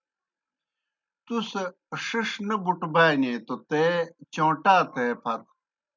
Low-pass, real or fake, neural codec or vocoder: 7.2 kHz; real; none